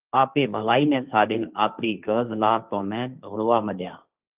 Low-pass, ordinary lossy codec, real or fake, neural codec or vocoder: 3.6 kHz; Opus, 24 kbps; fake; codec, 16 kHz, 1.1 kbps, Voila-Tokenizer